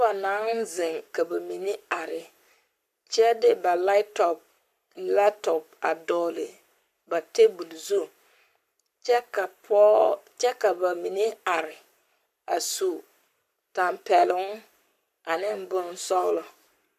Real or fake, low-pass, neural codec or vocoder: fake; 14.4 kHz; codec, 44.1 kHz, 7.8 kbps, Pupu-Codec